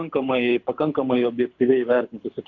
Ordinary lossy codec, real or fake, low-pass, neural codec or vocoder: AAC, 48 kbps; fake; 7.2 kHz; codec, 24 kHz, 6 kbps, HILCodec